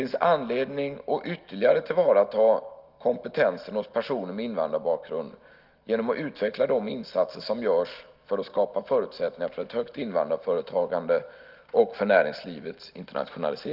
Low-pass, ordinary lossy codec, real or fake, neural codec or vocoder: 5.4 kHz; Opus, 24 kbps; real; none